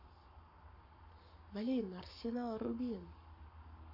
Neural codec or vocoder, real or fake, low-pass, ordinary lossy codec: codec, 44.1 kHz, 7.8 kbps, Pupu-Codec; fake; 5.4 kHz; AAC, 48 kbps